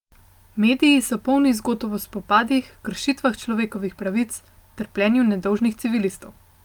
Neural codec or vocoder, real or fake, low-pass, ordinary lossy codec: none; real; 19.8 kHz; Opus, 32 kbps